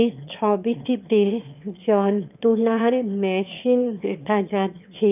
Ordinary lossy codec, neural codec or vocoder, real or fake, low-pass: none; autoencoder, 22.05 kHz, a latent of 192 numbers a frame, VITS, trained on one speaker; fake; 3.6 kHz